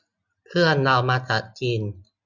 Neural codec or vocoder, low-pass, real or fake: none; 7.2 kHz; real